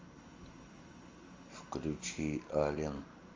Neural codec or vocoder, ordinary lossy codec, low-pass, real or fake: none; Opus, 32 kbps; 7.2 kHz; real